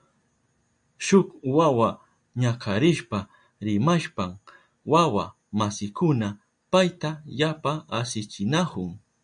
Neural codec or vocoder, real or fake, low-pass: none; real; 9.9 kHz